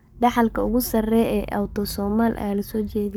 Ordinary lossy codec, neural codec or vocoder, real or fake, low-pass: none; codec, 44.1 kHz, 7.8 kbps, Pupu-Codec; fake; none